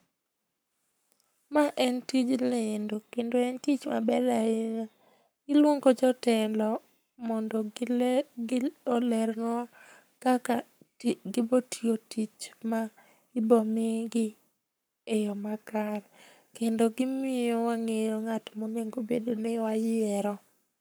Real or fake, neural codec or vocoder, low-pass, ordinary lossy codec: fake; codec, 44.1 kHz, 7.8 kbps, Pupu-Codec; none; none